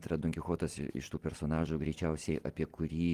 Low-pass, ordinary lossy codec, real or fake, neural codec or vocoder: 14.4 kHz; Opus, 24 kbps; fake; vocoder, 44.1 kHz, 128 mel bands every 256 samples, BigVGAN v2